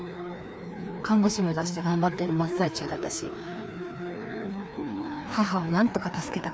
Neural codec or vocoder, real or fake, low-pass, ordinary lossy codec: codec, 16 kHz, 2 kbps, FreqCodec, larger model; fake; none; none